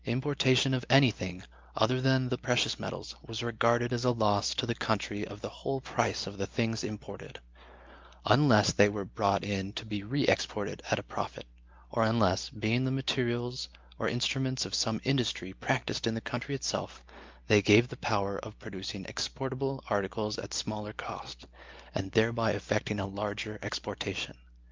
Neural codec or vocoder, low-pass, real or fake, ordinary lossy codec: none; 7.2 kHz; real; Opus, 24 kbps